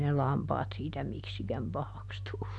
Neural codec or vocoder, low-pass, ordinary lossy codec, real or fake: none; none; none; real